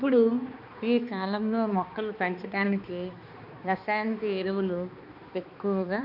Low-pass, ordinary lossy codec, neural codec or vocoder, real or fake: 5.4 kHz; none; codec, 16 kHz, 2 kbps, X-Codec, HuBERT features, trained on balanced general audio; fake